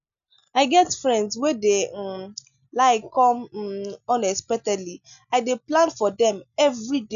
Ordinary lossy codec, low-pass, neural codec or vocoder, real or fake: none; 7.2 kHz; none; real